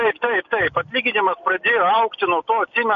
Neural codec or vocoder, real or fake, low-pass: none; real; 7.2 kHz